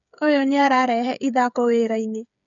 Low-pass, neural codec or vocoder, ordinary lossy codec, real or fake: 7.2 kHz; codec, 16 kHz, 8 kbps, FreqCodec, smaller model; none; fake